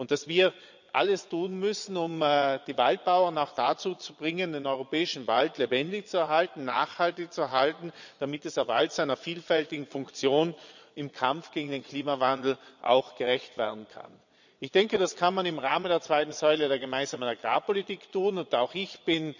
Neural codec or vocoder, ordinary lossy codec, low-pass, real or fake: vocoder, 22.05 kHz, 80 mel bands, Vocos; none; 7.2 kHz; fake